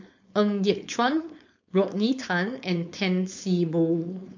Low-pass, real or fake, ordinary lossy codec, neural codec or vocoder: 7.2 kHz; fake; MP3, 48 kbps; codec, 16 kHz, 4.8 kbps, FACodec